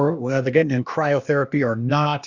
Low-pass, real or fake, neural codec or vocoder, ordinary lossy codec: 7.2 kHz; fake; codec, 16 kHz, 0.8 kbps, ZipCodec; Opus, 64 kbps